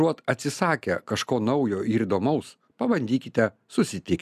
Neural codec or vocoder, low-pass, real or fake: none; 14.4 kHz; real